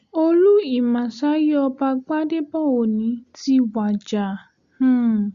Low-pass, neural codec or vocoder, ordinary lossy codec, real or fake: 7.2 kHz; none; Opus, 64 kbps; real